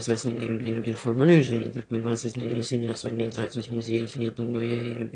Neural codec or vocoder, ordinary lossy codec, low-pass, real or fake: autoencoder, 22.05 kHz, a latent of 192 numbers a frame, VITS, trained on one speaker; AAC, 48 kbps; 9.9 kHz; fake